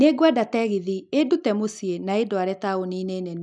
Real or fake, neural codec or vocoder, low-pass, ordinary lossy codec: real; none; 9.9 kHz; none